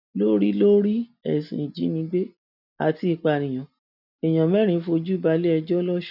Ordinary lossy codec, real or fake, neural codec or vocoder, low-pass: none; real; none; 5.4 kHz